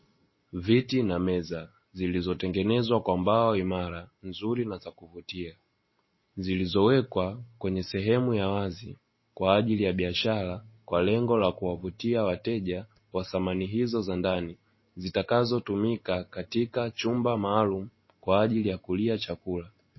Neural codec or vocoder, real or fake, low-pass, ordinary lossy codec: none; real; 7.2 kHz; MP3, 24 kbps